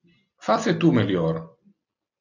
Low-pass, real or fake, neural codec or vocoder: 7.2 kHz; real; none